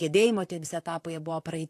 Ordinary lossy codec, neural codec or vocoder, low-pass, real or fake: AAC, 64 kbps; vocoder, 44.1 kHz, 128 mel bands, Pupu-Vocoder; 14.4 kHz; fake